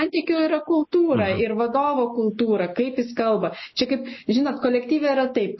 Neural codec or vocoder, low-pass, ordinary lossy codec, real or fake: none; 7.2 kHz; MP3, 24 kbps; real